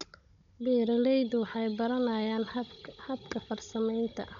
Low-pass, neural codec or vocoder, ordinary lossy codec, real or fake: 7.2 kHz; codec, 16 kHz, 16 kbps, FunCodec, trained on Chinese and English, 50 frames a second; none; fake